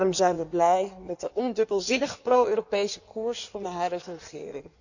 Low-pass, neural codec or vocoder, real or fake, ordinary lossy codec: 7.2 kHz; codec, 16 kHz in and 24 kHz out, 1.1 kbps, FireRedTTS-2 codec; fake; none